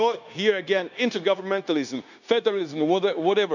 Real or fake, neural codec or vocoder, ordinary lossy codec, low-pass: fake; codec, 16 kHz, 0.9 kbps, LongCat-Audio-Codec; none; 7.2 kHz